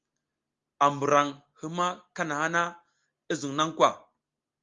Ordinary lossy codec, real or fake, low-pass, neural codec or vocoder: Opus, 32 kbps; real; 7.2 kHz; none